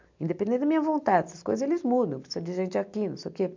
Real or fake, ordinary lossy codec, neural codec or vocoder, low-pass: real; MP3, 64 kbps; none; 7.2 kHz